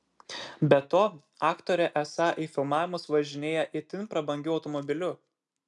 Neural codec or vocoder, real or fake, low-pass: none; real; 10.8 kHz